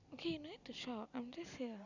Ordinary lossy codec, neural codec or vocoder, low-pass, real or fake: none; none; 7.2 kHz; real